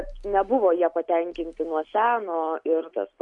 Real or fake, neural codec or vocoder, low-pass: real; none; 10.8 kHz